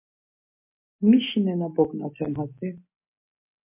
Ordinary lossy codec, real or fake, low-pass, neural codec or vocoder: MP3, 24 kbps; real; 3.6 kHz; none